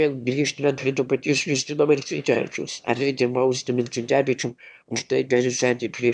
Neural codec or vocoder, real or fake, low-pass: autoencoder, 22.05 kHz, a latent of 192 numbers a frame, VITS, trained on one speaker; fake; 9.9 kHz